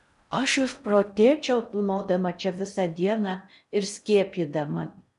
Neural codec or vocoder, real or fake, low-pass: codec, 16 kHz in and 24 kHz out, 0.6 kbps, FocalCodec, streaming, 4096 codes; fake; 10.8 kHz